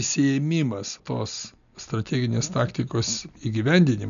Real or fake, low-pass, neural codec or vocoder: real; 7.2 kHz; none